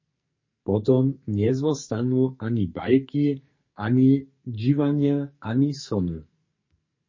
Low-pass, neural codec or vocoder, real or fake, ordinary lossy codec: 7.2 kHz; codec, 44.1 kHz, 2.6 kbps, SNAC; fake; MP3, 32 kbps